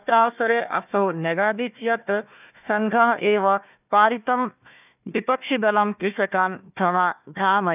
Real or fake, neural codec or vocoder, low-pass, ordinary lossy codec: fake; codec, 16 kHz, 1 kbps, FunCodec, trained on Chinese and English, 50 frames a second; 3.6 kHz; none